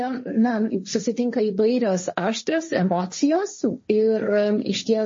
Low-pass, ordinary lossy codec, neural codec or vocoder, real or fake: 7.2 kHz; MP3, 32 kbps; codec, 16 kHz, 1.1 kbps, Voila-Tokenizer; fake